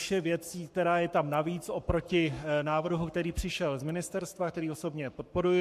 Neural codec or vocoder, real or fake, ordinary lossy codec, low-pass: codec, 44.1 kHz, 7.8 kbps, Pupu-Codec; fake; MP3, 64 kbps; 14.4 kHz